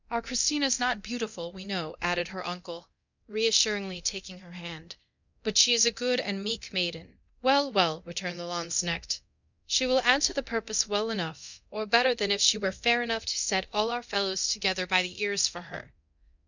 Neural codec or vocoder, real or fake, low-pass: codec, 24 kHz, 0.9 kbps, DualCodec; fake; 7.2 kHz